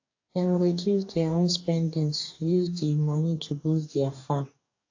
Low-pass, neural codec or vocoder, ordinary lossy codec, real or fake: 7.2 kHz; codec, 44.1 kHz, 2.6 kbps, DAC; AAC, 48 kbps; fake